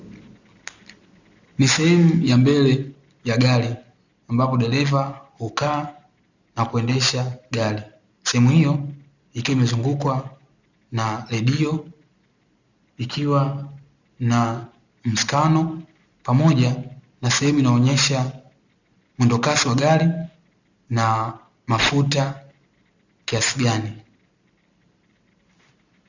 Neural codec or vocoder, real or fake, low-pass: none; real; 7.2 kHz